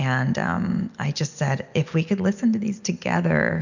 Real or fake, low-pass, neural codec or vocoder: real; 7.2 kHz; none